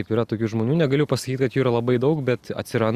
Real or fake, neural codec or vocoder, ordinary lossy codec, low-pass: real; none; Opus, 64 kbps; 14.4 kHz